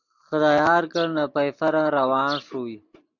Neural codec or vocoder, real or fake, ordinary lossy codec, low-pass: none; real; MP3, 64 kbps; 7.2 kHz